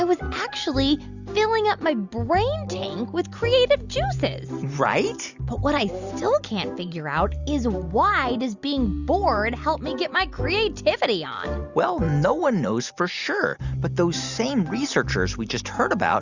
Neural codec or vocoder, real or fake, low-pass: none; real; 7.2 kHz